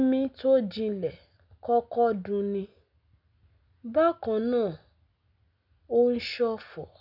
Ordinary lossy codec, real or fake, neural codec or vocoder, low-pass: none; real; none; 5.4 kHz